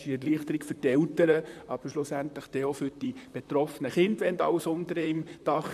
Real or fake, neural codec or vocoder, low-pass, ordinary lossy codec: fake; vocoder, 44.1 kHz, 128 mel bands, Pupu-Vocoder; 14.4 kHz; none